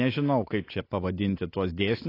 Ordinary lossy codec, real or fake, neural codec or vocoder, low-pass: AAC, 24 kbps; real; none; 5.4 kHz